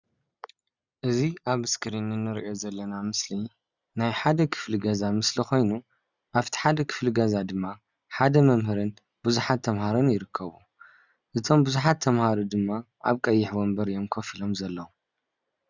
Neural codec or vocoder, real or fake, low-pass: none; real; 7.2 kHz